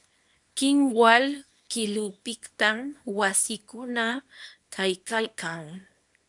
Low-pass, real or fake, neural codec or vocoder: 10.8 kHz; fake; codec, 24 kHz, 0.9 kbps, WavTokenizer, small release